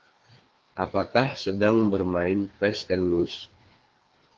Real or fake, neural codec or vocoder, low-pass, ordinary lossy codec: fake; codec, 16 kHz, 2 kbps, FreqCodec, larger model; 7.2 kHz; Opus, 16 kbps